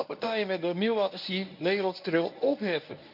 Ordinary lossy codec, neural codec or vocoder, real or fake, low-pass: none; codec, 24 kHz, 0.9 kbps, WavTokenizer, medium speech release version 1; fake; 5.4 kHz